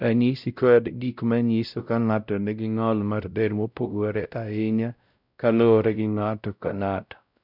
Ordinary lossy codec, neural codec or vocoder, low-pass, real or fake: none; codec, 16 kHz, 0.5 kbps, X-Codec, WavLM features, trained on Multilingual LibriSpeech; 5.4 kHz; fake